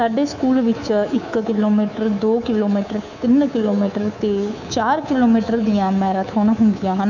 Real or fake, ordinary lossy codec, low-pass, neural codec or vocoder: fake; none; 7.2 kHz; codec, 24 kHz, 3.1 kbps, DualCodec